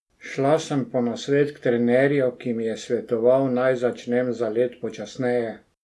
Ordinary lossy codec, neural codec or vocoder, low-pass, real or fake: none; none; none; real